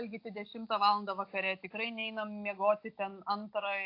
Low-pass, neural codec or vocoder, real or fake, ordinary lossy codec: 5.4 kHz; none; real; AAC, 32 kbps